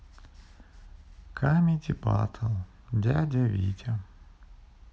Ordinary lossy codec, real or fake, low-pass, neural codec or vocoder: none; real; none; none